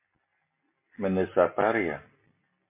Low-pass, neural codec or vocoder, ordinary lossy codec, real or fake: 3.6 kHz; none; MP3, 24 kbps; real